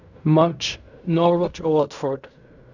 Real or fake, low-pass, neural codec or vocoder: fake; 7.2 kHz; codec, 16 kHz in and 24 kHz out, 0.4 kbps, LongCat-Audio-Codec, fine tuned four codebook decoder